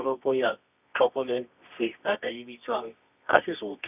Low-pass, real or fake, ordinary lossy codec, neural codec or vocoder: 3.6 kHz; fake; none; codec, 24 kHz, 0.9 kbps, WavTokenizer, medium music audio release